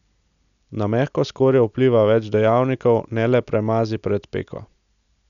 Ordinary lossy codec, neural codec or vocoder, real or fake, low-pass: none; none; real; 7.2 kHz